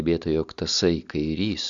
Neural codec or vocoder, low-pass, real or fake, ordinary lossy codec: none; 7.2 kHz; real; Opus, 64 kbps